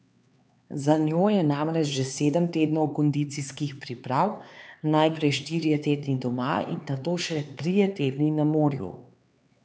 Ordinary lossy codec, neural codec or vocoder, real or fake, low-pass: none; codec, 16 kHz, 4 kbps, X-Codec, HuBERT features, trained on LibriSpeech; fake; none